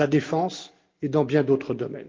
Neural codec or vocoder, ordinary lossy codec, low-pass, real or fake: none; Opus, 32 kbps; 7.2 kHz; real